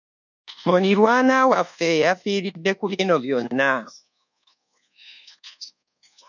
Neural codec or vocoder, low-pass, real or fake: codec, 24 kHz, 1.2 kbps, DualCodec; 7.2 kHz; fake